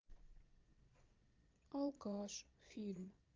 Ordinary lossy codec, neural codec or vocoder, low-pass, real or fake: Opus, 24 kbps; vocoder, 22.05 kHz, 80 mel bands, Vocos; 7.2 kHz; fake